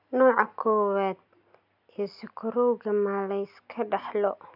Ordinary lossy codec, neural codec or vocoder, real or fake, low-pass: none; none; real; 5.4 kHz